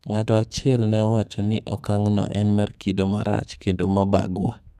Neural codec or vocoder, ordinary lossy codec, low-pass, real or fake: codec, 32 kHz, 1.9 kbps, SNAC; none; 14.4 kHz; fake